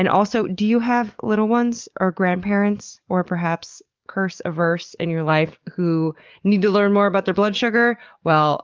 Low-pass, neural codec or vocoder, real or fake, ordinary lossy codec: 7.2 kHz; codec, 44.1 kHz, 7.8 kbps, DAC; fake; Opus, 32 kbps